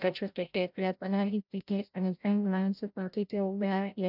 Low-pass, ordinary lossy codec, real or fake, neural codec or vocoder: 5.4 kHz; none; fake; codec, 16 kHz, 0.5 kbps, FreqCodec, larger model